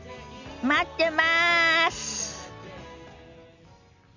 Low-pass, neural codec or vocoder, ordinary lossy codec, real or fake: 7.2 kHz; none; none; real